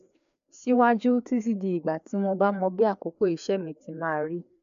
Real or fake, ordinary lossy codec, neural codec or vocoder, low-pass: fake; none; codec, 16 kHz, 2 kbps, FreqCodec, larger model; 7.2 kHz